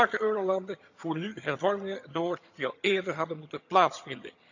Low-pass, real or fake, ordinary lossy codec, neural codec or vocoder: 7.2 kHz; fake; none; vocoder, 22.05 kHz, 80 mel bands, HiFi-GAN